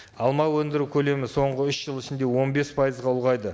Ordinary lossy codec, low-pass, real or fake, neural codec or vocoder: none; none; real; none